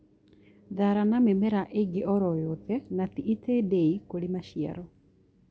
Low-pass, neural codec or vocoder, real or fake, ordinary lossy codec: none; none; real; none